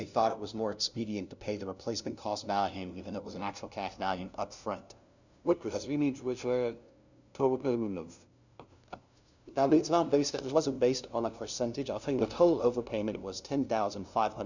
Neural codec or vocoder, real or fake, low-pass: codec, 16 kHz, 0.5 kbps, FunCodec, trained on LibriTTS, 25 frames a second; fake; 7.2 kHz